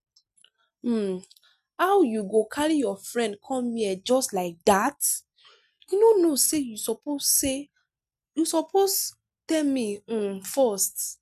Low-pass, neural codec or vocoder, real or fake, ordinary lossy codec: 14.4 kHz; none; real; none